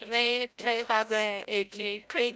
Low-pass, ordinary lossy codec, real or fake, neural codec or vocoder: none; none; fake; codec, 16 kHz, 0.5 kbps, FreqCodec, larger model